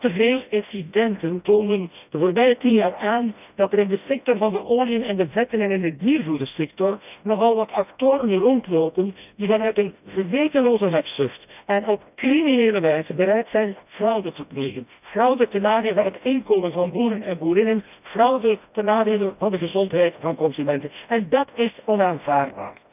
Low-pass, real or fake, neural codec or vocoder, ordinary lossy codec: 3.6 kHz; fake; codec, 16 kHz, 1 kbps, FreqCodec, smaller model; none